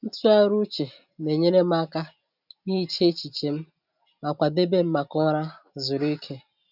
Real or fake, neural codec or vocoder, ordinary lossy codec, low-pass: real; none; none; 5.4 kHz